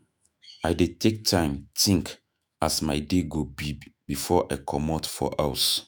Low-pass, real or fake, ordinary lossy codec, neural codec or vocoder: none; fake; none; autoencoder, 48 kHz, 128 numbers a frame, DAC-VAE, trained on Japanese speech